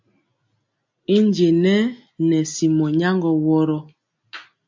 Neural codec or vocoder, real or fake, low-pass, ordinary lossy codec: none; real; 7.2 kHz; MP3, 48 kbps